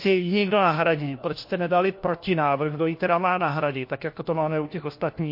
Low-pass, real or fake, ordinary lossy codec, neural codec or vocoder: 5.4 kHz; fake; MP3, 32 kbps; codec, 16 kHz, 1 kbps, FunCodec, trained on LibriTTS, 50 frames a second